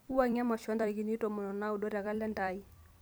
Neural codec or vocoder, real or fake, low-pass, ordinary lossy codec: vocoder, 44.1 kHz, 128 mel bands every 256 samples, BigVGAN v2; fake; none; none